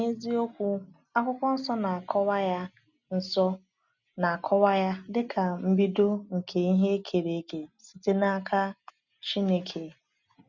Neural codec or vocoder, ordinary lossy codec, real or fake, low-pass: none; none; real; 7.2 kHz